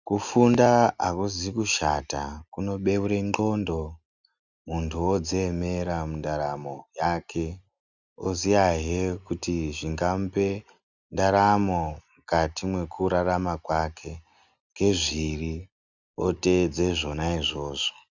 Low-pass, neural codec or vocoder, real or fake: 7.2 kHz; none; real